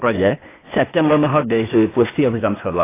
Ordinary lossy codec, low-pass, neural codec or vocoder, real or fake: AAC, 24 kbps; 3.6 kHz; codec, 16 kHz, 0.8 kbps, ZipCodec; fake